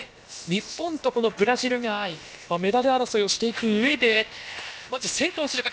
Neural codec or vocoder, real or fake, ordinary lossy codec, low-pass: codec, 16 kHz, about 1 kbps, DyCAST, with the encoder's durations; fake; none; none